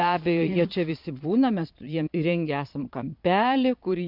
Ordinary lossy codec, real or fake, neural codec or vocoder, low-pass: MP3, 48 kbps; fake; vocoder, 22.05 kHz, 80 mel bands, Vocos; 5.4 kHz